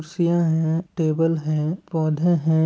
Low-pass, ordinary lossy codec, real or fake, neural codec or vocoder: none; none; real; none